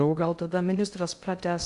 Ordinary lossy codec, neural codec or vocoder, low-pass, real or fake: Opus, 64 kbps; codec, 16 kHz in and 24 kHz out, 0.6 kbps, FocalCodec, streaming, 2048 codes; 10.8 kHz; fake